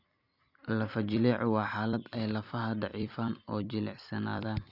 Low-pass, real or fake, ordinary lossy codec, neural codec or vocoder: 5.4 kHz; fake; none; vocoder, 44.1 kHz, 128 mel bands every 256 samples, BigVGAN v2